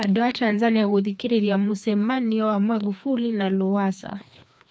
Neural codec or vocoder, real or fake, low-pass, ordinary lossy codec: codec, 16 kHz, 2 kbps, FreqCodec, larger model; fake; none; none